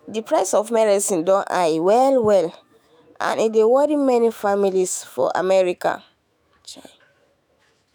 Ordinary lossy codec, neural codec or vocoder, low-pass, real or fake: none; autoencoder, 48 kHz, 128 numbers a frame, DAC-VAE, trained on Japanese speech; none; fake